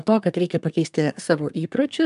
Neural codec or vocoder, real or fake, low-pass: codec, 24 kHz, 1 kbps, SNAC; fake; 10.8 kHz